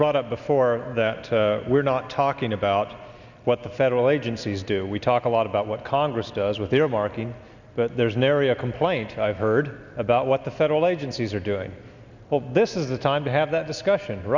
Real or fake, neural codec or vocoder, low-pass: real; none; 7.2 kHz